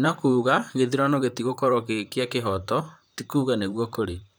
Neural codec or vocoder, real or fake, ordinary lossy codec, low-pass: vocoder, 44.1 kHz, 128 mel bands, Pupu-Vocoder; fake; none; none